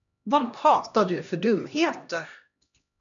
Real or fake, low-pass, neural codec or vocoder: fake; 7.2 kHz; codec, 16 kHz, 1 kbps, X-Codec, HuBERT features, trained on LibriSpeech